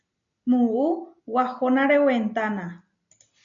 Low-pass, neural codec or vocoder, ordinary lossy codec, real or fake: 7.2 kHz; none; AAC, 64 kbps; real